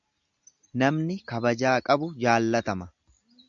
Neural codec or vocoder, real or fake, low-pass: none; real; 7.2 kHz